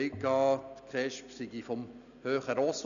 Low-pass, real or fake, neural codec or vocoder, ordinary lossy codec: 7.2 kHz; real; none; none